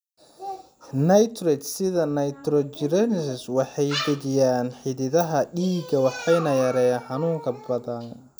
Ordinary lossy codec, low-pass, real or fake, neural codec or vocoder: none; none; real; none